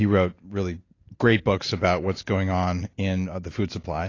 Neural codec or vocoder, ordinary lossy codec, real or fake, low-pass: none; AAC, 32 kbps; real; 7.2 kHz